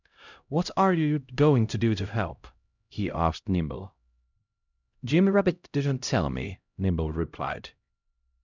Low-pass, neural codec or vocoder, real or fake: 7.2 kHz; codec, 16 kHz, 0.5 kbps, X-Codec, HuBERT features, trained on LibriSpeech; fake